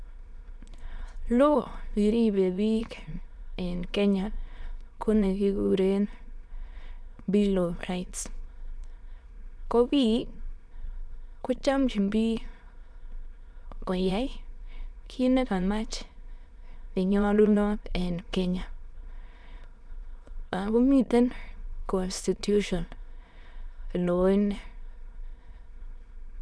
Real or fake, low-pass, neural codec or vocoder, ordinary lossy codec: fake; none; autoencoder, 22.05 kHz, a latent of 192 numbers a frame, VITS, trained on many speakers; none